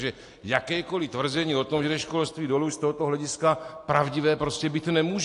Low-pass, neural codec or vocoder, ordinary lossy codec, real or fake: 10.8 kHz; none; AAC, 48 kbps; real